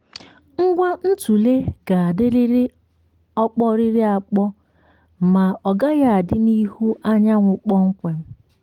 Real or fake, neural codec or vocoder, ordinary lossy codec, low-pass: real; none; Opus, 24 kbps; 19.8 kHz